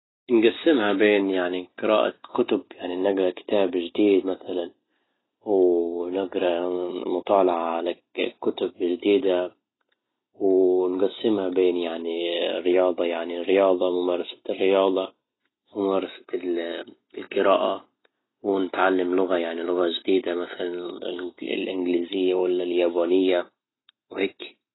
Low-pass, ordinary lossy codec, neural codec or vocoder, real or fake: 7.2 kHz; AAC, 16 kbps; none; real